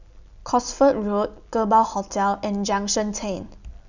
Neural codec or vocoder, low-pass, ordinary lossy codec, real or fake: none; 7.2 kHz; none; real